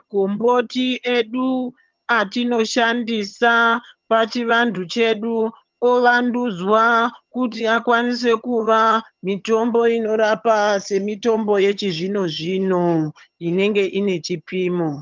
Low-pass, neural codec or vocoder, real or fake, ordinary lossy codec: 7.2 kHz; vocoder, 22.05 kHz, 80 mel bands, HiFi-GAN; fake; Opus, 24 kbps